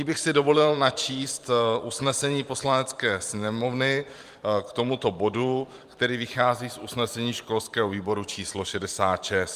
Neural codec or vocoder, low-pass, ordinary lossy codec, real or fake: vocoder, 44.1 kHz, 128 mel bands every 512 samples, BigVGAN v2; 14.4 kHz; Opus, 32 kbps; fake